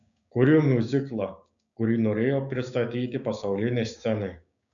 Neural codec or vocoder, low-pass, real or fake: codec, 16 kHz, 6 kbps, DAC; 7.2 kHz; fake